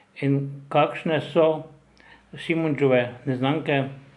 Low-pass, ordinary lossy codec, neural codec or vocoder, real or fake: 10.8 kHz; AAC, 64 kbps; none; real